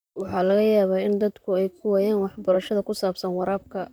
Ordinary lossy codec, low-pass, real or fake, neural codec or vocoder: none; none; fake; vocoder, 44.1 kHz, 128 mel bands, Pupu-Vocoder